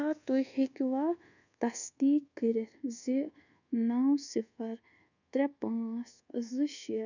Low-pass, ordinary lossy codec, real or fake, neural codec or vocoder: 7.2 kHz; none; fake; codec, 24 kHz, 1.2 kbps, DualCodec